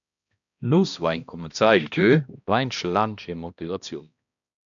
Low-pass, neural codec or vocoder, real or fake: 7.2 kHz; codec, 16 kHz, 1 kbps, X-Codec, HuBERT features, trained on balanced general audio; fake